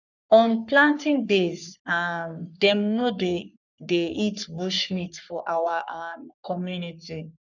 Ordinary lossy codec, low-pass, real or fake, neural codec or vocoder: none; 7.2 kHz; fake; codec, 44.1 kHz, 3.4 kbps, Pupu-Codec